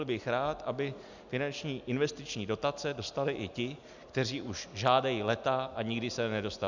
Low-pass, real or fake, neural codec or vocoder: 7.2 kHz; real; none